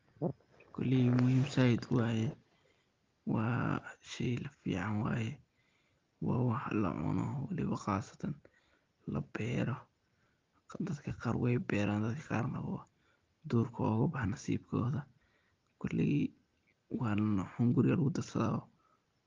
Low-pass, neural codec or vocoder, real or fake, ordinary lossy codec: 7.2 kHz; none; real; Opus, 32 kbps